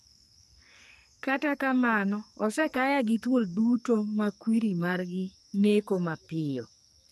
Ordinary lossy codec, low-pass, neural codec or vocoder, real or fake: none; 14.4 kHz; codec, 44.1 kHz, 2.6 kbps, SNAC; fake